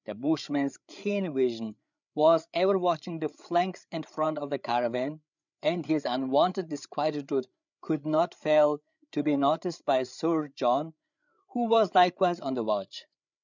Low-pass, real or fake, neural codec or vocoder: 7.2 kHz; fake; codec, 16 kHz, 8 kbps, FreqCodec, larger model